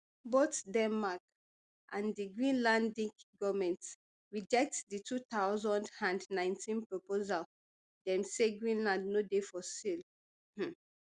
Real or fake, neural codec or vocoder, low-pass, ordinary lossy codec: real; none; 9.9 kHz; Opus, 64 kbps